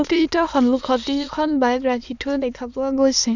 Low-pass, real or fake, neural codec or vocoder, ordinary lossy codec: 7.2 kHz; fake; autoencoder, 22.05 kHz, a latent of 192 numbers a frame, VITS, trained on many speakers; none